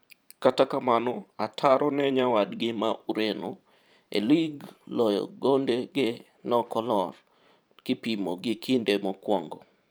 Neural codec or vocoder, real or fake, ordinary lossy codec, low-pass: vocoder, 44.1 kHz, 128 mel bands every 512 samples, BigVGAN v2; fake; none; none